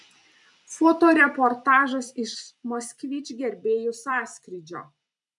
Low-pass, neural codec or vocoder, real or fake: 10.8 kHz; vocoder, 44.1 kHz, 128 mel bands every 256 samples, BigVGAN v2; fake